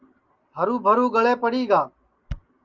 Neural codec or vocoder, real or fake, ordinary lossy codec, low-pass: none; real; Opus, 32 kbps; 7.2 kHz